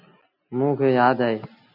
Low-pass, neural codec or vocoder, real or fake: 5.4 kHz; none; real